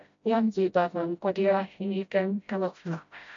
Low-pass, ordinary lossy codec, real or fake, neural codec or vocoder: 7.2 kHz; MP3, 64 kbps; fake; codec, 16 kHz, 0.5 kbps, FreqCodec, smaller model